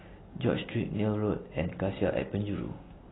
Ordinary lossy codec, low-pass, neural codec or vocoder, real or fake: AAC, 16 kbps; 7.2 kHz; none; real